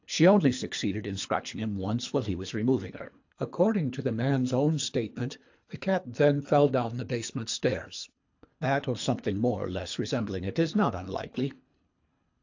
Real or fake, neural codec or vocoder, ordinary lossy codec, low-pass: fake; codec, 24 kHz, 3 kbps, HILCodec; AAC, 48 kbps; 7.2 kHz